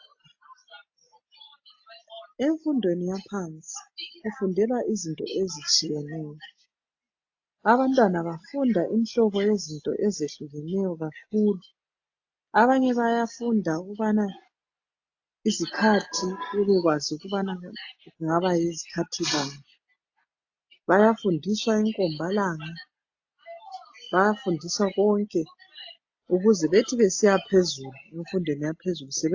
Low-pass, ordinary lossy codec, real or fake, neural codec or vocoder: 7.2 kHz; AAC, 48 kbps; real; none